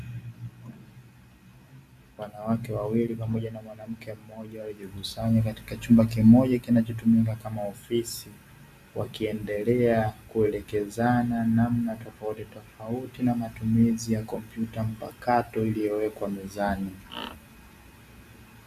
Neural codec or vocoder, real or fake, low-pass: none; real; 14.4 kHz